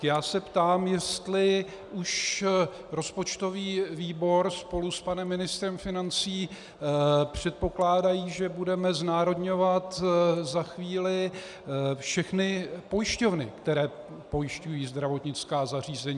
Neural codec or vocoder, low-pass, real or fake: none; 10.8 kHz; real